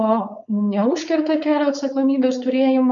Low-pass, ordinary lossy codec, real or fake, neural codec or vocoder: 7.2 kHz; MP3, 96 kbps; fake; codec, 16 kHz, 4.8 kbps, FACodec